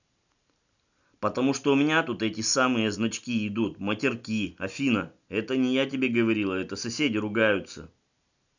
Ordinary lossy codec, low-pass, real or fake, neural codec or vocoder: none; 7.2 kHz; real; none